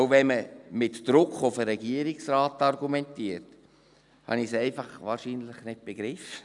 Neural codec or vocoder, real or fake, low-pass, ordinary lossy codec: none; real; 10.8 kHz; none